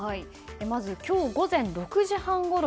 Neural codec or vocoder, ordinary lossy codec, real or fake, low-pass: none; none; real; none